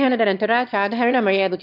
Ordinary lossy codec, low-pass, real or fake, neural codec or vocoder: AAC, 48 kbps; 5.4 kHz; fake; autoencoder, 22.05 kHz, a latent of 192 numbers a frame, VITS, trained on one speaker